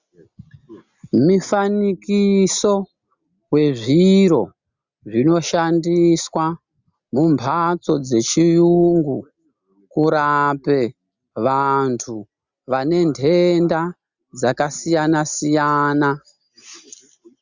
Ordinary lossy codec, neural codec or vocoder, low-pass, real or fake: Opus, 64 kbps; none; 7.2 kHz; real